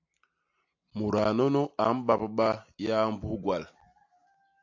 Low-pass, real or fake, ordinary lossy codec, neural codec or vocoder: 7.2 kHz; real; AAC, 48 kbps; none